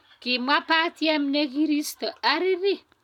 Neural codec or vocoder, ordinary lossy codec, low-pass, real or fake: none; none; 19.8 kHz; real